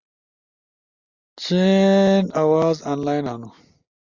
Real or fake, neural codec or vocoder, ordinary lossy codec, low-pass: real; none; Opus, 64 kbps; 7.2 kHz